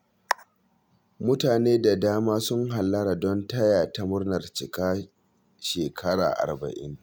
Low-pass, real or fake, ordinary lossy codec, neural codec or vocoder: none; real; none; none